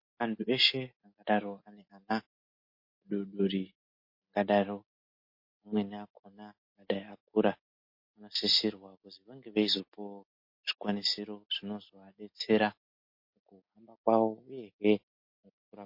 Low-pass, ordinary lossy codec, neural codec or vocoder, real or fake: 5.4 kHz; MP3, 32 kbps; none; real